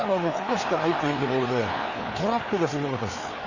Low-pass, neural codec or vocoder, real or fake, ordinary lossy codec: 7.2 kHz; codec, 16 kHz, 4 kbps, FreqCodec, larger model; fake; none